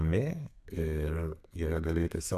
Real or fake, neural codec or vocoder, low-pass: fake; codec, 32 kHz, 1.9 kbps, SNAC; 14.4 kHz